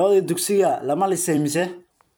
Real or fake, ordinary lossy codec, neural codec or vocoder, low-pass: fake; none; vocoder, 44.1 kHz, 128 mel bands every 256 samples, BigVGAN v2; none